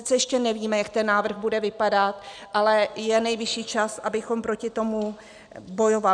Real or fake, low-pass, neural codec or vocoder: real; 9.9 kHz; none